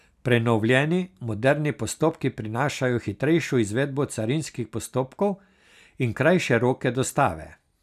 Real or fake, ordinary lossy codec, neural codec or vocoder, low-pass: real; none; none; 14.4 kHz